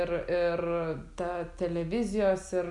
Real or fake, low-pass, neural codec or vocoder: real; 10.8 kHz; none